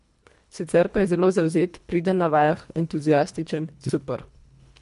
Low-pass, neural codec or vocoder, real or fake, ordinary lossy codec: 10.8 kHz; codec, 24 kHz, 1.5 kbps, HILCodec; fake; MP3, 64 kbps